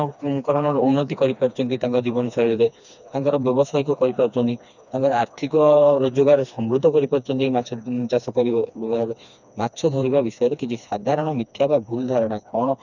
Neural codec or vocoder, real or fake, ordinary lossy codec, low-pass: codec, 16 kHz, 2 kbps, FreqCodec, smaller model; fake; none; 7.2 kHz